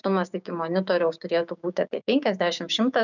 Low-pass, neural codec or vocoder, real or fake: 7.2 kHz; codec, 16 kHz, 6 kbps, DAC; fake